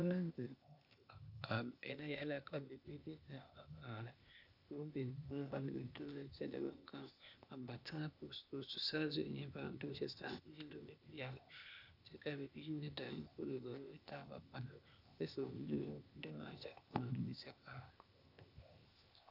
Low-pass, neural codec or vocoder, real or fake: 5.4 kHz; codec, 16 kHz, 0.8 kbps, ZipCodec; fake